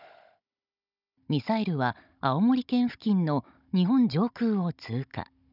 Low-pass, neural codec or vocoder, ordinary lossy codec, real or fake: 5.4 kHz; codec, 16 kHz, 16 kbps, FunCodec, trained on Chinese and English, 50 frames a second; none; fake